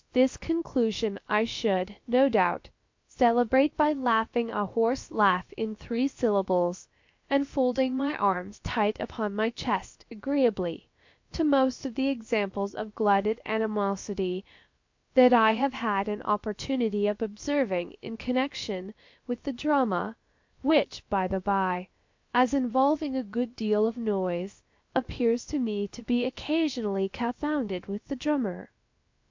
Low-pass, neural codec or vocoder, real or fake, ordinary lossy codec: 7.2 kHz; codec, 16 kHz, about 1 kbps, DyCAST, with the encoder's durations; fake; MP3, 48 kbps